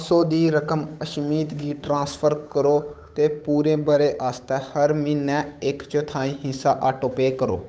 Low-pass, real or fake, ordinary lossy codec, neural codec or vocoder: none; fake; none; codec, 16 kHz, 8 kbps, FunCodec, trained on Chinese and English, 25 frames a second